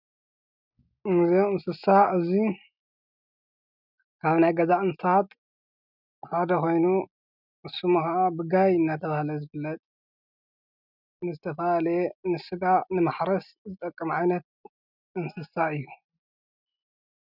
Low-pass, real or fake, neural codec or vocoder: 5.4 kHz; real; none